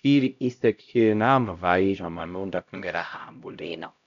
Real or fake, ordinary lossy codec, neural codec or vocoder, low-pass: fake; none; codec, 16 kHz, 0.5 kbps, X-Codec, HuBERT features, trained on LibriSpeech; 7.2 kHz